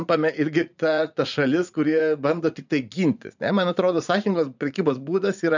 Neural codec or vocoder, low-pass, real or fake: vocoder, 44.1 kHz, 80 mel bands, Vocos; 7.2 kHz; fake